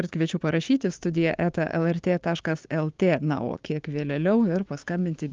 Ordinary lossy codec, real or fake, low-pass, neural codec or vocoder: Opus, 32 kbps; fake; 7.2 kHz; codec, 16 kHz, 6 kbps, DAC